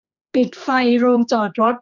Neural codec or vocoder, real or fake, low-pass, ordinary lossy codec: codec, 16 kHz, 2 kbps, X-Codec, HuBERT features, trained on general audio; fake; 7.2 kHz; none